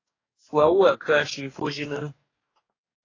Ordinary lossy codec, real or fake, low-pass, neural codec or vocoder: AAC, 32 kbps; fake; 7.2 kHz; codec, 44.1 kHz, 2.6 kbps, DAC